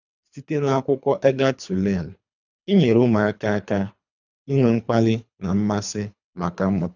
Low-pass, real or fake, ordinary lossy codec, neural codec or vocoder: 7.2 kHz; fake; none; codec, 24 kHz, 3 kbps, HILCodec